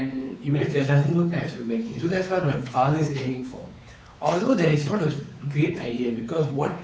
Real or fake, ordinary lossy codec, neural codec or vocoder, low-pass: fake; none; codec, 16 kHz, 4 kbps, X-Codec, WavLM features, trained on Multilingual LibriSpeech; none